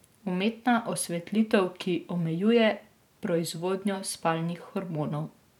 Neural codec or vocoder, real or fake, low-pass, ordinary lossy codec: vocoder, 44.1 kHz, 128 mel bands every 512 samples, BigVGAN v2; fake; 19.8 kHz; none